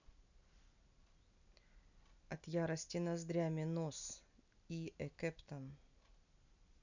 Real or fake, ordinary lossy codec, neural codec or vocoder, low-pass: real; none; none; 7.2 kHz